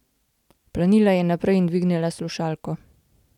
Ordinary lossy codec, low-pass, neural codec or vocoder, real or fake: none; 19.8 kHz; none; real